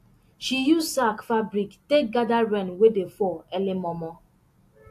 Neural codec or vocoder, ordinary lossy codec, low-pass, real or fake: none; AAC, 64 kbps; 14.4 kHz; real